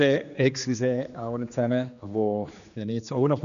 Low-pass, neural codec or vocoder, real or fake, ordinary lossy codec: 7.2 kHz; codec, 16 kHz, 2 kbps, X-Codec, HuBERT features, trained on general audio; fake; none